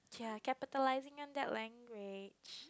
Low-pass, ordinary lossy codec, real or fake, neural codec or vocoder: none; none; real; none